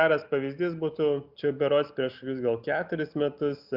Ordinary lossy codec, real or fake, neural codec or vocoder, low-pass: Opus, 64 kbps; real; none; 5.4 kHz